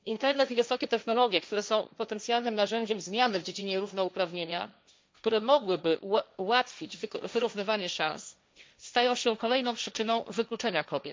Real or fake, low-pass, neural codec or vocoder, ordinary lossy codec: fake; none; codec, 16 kHz, 1.1 kbps, Voila-Tokenizer; none